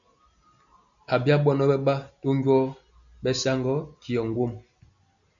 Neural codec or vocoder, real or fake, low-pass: none; real; 7.2 kHz